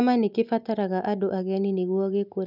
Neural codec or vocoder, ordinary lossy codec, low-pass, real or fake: none; none; 5.4 kHz; real